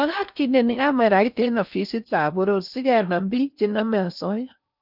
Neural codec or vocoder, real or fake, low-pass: codec, 16 kHz in and 24 kHz out, 0.6 kbps, FocalCodec, streaming, 4096 codes; fake; 5.4 kHz